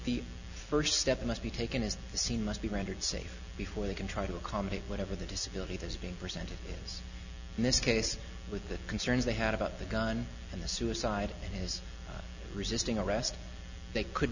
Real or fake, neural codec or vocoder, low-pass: real; none; 7.2 kHz